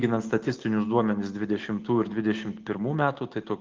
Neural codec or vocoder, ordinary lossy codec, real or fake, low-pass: none; Opus, 24 kbps; real; 7.2 kHz